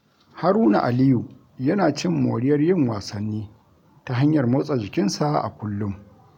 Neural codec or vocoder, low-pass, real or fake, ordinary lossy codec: none; 19.8 kHz; real; none